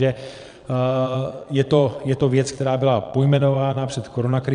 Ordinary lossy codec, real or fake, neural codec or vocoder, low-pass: AAC, 96 kbps; fake; vocoder, 22.05 kHz, 80 mel bands, Vocos; 9.9 kHz